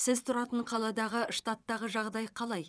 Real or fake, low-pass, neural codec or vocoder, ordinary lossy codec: fake; none; vocoder, 22.05 kHz, 80 mel bands, WaveNeXt; none